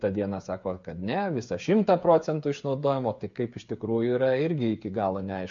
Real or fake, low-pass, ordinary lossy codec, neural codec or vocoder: fake; 7.2 kHz; MP3, 48 kbps; codec, 16 kHz, 8 kbps, FreqCodec, smaller model